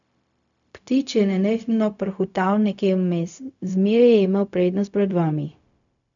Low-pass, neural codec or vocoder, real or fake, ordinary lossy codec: 7.2 kHz; codec, 16 kHz, 0.4 kbps, LongCat-Audio-Codec; fake; none